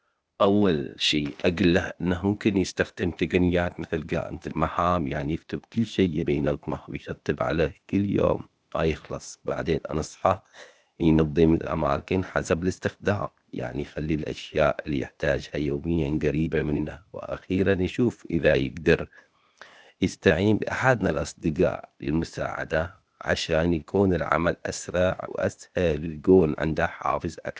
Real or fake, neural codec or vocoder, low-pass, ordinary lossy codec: fake; codec, 16 kHz, 0.8 kbps, ZipCodec; none; none